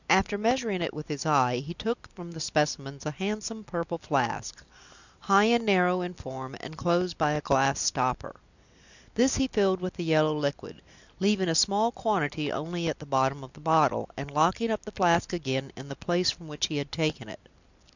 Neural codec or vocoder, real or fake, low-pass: none; real; 7.2 kHz